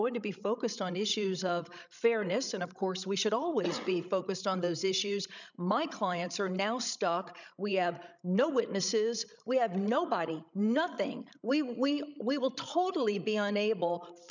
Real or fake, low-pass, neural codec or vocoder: fake; 7.2 kHz; codec, 16 kHz, 16 kbps, FreqCodec, larger model